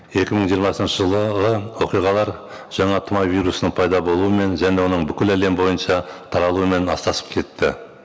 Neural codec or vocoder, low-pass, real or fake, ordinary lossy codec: none; none; real; none